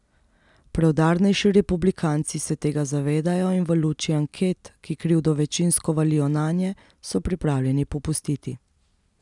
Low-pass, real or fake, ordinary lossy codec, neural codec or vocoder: 10.8 kHz; real; none; none